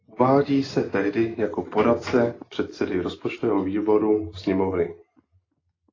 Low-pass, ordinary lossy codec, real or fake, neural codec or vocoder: 7.2 kHz; AAC, 32 kbps; real; none